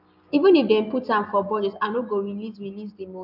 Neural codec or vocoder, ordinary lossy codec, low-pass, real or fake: none; none; 5.4 kHz; real